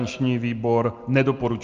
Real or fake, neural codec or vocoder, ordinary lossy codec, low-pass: real; none; Opus, 32 kbps; 7.2 kHz